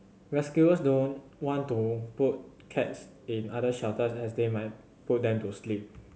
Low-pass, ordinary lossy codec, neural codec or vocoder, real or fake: none; none; none; real